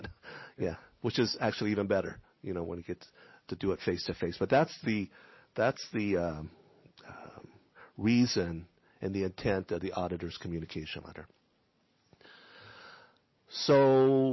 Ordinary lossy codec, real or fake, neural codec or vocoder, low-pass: MP3, 24 kbps; real; none; 7.2 kHz